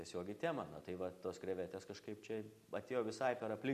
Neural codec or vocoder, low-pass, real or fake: none; 14.4 kHz; real